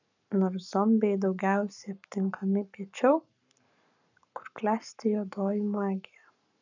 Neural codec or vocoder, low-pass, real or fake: codec, 44.1 kHz, 7.8 kbps, Pupu-Codec; 7.2 kHz; fake